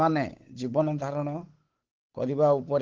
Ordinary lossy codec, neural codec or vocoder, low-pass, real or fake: Opus, 16 kbps; codec, 16 kHz, 8 kbps, FunCodec, trained on Chinese and English, 25 frames a second; 7.2 kHz; fake